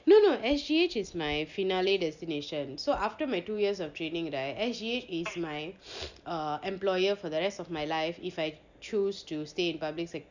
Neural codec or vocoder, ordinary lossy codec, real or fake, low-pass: none; none; real; 7.2 kHz